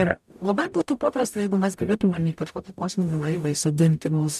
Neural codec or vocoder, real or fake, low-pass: codec, 44.1 kHz, 0.9 kbps, DAC; fake; 14.4 kHz